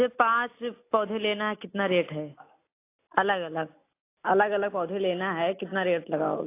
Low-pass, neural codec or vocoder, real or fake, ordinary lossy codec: 3.6 kHz; none; real; AAC, 24 kbps